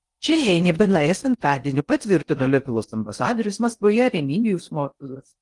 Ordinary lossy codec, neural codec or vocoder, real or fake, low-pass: Opus, 24 kbps; codec, 16 kHz in and 24 kHz out, 0.6 kbps, FocalCodec, streaming, 4096 codes; fake; 10.8 kHz